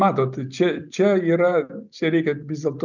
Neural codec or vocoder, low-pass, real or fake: none; 7.2 kHz; real